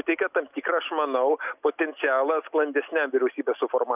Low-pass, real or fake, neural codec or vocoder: 3.6 kHz; real; none